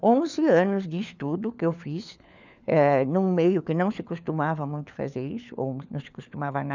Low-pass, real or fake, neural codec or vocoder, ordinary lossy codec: 7.2 kHz; fake; codec, 16 kHz, 4 kbps, FunCodec, trained on LibriTTS, 50 frames a second; none